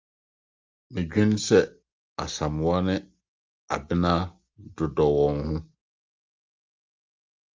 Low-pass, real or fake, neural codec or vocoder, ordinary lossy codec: 7.2 kHz; real; none; Opus, 32 kbps